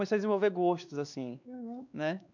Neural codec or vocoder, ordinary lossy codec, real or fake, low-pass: codec, 16 kHz, 2 kbps, X-Codec, WavLM features, trained on Multilingual LibriSpeech; none; fake; 7.2 kHz